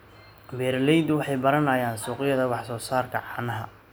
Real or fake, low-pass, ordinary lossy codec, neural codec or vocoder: real; none; none; none